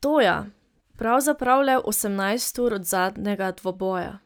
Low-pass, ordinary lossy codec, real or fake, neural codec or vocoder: none; none; real; none